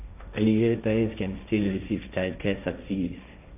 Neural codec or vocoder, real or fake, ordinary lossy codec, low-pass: codec, 16 kHz, 1.1 kbps, Voila-Tokenizer; fake; none; 3.6 kHz